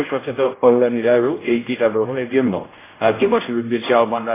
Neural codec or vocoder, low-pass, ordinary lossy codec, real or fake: codec, 16 kHz, 0.5 kbps, X-Codec, HuBERT features, trained on general audio; 3.6 kHz; AAC, 16 kbps; fake